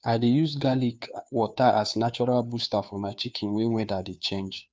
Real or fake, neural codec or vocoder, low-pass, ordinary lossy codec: fake; codec, 16 kHz, 2 kbps, FunCodec, trained on Chinese and English, 25 frames a second; none; none